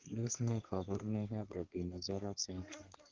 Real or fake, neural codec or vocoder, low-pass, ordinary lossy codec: fake; codec, 32 kHz, 1.9 kbps, SNAC; 7.2 kHz; Opus, 16 kbps